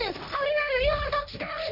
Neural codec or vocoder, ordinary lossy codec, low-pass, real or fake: codec, 16 kHz, 1.1 kbps, Voila-Tokenizer; none; 5.4 kHz; fake